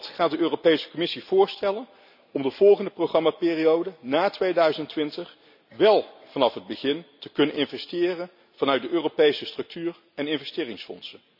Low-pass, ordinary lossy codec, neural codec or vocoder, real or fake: 5.4 kHz; none; none; real